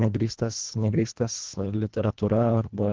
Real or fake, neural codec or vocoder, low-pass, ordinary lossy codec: fake; codec, 24 kHz, 1.5 kbps, HILCodec; 7.2 kHz; Opus, 16 kbps